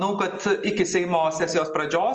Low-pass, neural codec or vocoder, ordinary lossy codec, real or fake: 7.2 kHz; none; Opus, 24 kbps; real